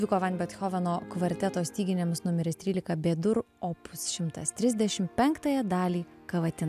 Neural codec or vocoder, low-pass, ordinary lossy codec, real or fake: none; 14.4 kHz; AAC, 96 kbps; real